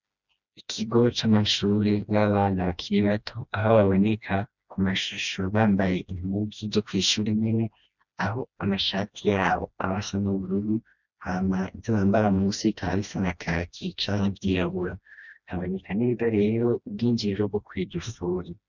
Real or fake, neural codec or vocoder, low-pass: fake; codec, 16 kHz, 1 kbps, FreqCodec, smaller model; 7.2 kHz